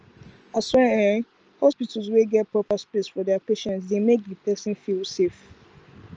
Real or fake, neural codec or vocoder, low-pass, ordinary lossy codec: real; none; 7.2 kHz; Opus, 24 kbps